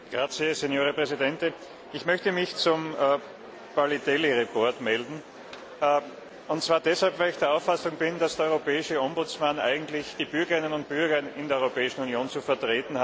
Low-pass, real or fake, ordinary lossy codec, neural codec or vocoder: none; real; none; none